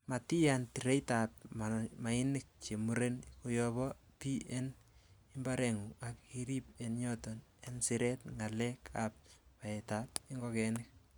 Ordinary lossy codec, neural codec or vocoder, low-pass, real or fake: none; none; none; real